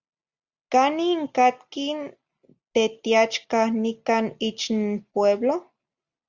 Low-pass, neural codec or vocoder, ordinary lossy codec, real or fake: 7.2 kHz; none; Opus, 64 kbps; real